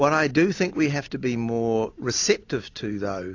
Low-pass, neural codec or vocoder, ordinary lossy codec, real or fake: 7.2 kHz; none; AAC, 48 kbps; real